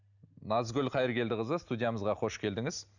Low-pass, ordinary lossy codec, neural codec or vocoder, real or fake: 7.2 kHz; none; none; real